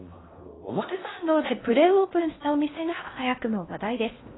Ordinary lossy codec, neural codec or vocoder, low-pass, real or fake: AAC, 16 kbps; codec, 16 kHz in and 24 kHz out, 0.6 kbps, FocalCodec, streaming, 4096 codes; 7.2 kHz; fake